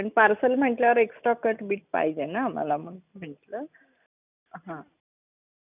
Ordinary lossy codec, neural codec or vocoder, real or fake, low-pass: none; none; real; 3.6 kHz